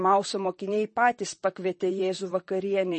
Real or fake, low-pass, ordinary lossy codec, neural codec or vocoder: fake; 10.8 kHz; MP3, 32 kbps; vocoder, 44.1 kHz, 128 mel bands, Pupu-Vocoder